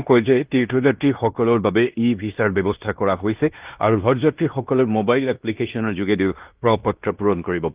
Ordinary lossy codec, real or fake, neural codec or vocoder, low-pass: Opus, 32 kbps; fake; codec, 16 kHz in and 24 kHz out, 0.9 kbps, LongCat-Audio-Codec, fine tuned four codebook decoder; 3.6 kHz